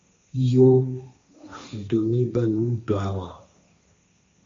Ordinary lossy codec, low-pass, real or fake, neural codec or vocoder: MP3, 48 kbps; 7.2 kHz; fake; codec, 16 kHz, 1.1 kbps, Voila-Tokenizer